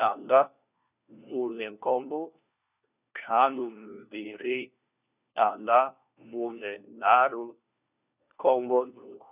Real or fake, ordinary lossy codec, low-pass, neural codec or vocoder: fake; none; 3.6 kHz; codec, 16 kHz, 1 kbps, FunCodec, trained on LibriTTS, 50 frames a second